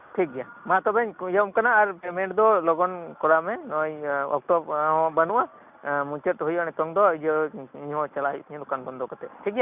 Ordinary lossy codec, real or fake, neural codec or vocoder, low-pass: none; real; none; 3.6 kHz